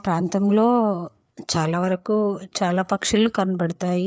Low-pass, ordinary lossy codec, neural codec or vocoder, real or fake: none; none; codec, 16 kHz, 4 kbps, FreqCodec, larger model; fake